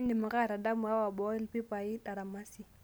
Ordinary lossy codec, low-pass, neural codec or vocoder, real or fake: none; none; none; real